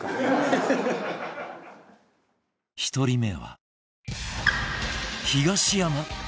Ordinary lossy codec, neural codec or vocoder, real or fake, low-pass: none; none; real; none